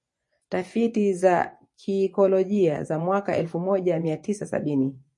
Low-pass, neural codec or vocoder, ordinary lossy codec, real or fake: 10.8 kHz; vocoder, 24 kHz, 100 mel bands, Vocos; MP3, 48 kbps; fake